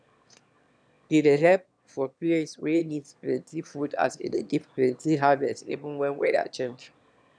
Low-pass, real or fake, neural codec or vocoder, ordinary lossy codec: 9.9 kHz; fake; autoencoder, 22.05 kHz, a latent of 192 numbers a frame, VITS, trained on one speaker; none